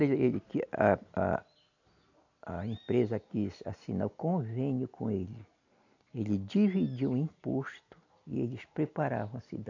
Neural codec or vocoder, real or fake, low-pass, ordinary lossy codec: none; real; 7.2 kHz; AAC, 48 kbps